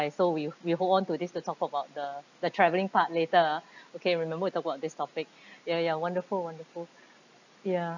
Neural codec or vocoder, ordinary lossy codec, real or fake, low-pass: none; none; real; 7.2 kHz